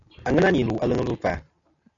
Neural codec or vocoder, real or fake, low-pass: none; real; 7.2 kHz